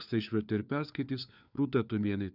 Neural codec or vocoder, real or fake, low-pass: codec, 16 kHz, 4 kbps, FunCodec, trained on LibriTTS, 50 frames a second; fake; 5.4 kHz